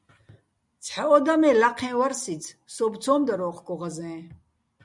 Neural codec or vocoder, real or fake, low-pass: none; real; 10.8 kHz